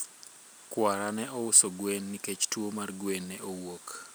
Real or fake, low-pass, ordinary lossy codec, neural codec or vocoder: real; none; none; none